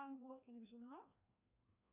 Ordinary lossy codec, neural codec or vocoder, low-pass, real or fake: MP3, 32 kbps; codec, 16 kHz, 1 kbps, FreqCodec, larger model; 3.6 kHz; fake